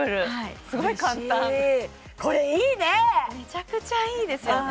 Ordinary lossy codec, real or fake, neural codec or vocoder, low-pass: none; real; none; none